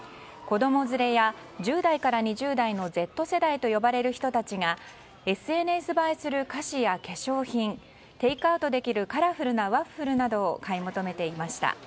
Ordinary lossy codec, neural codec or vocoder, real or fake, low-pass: none; none; real; none